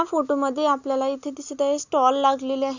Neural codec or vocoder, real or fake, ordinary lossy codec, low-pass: none; real; Opus, 64 kbps; 7.2 kHz